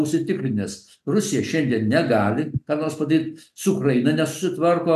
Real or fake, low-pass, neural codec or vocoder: real; 14.4 kHz; none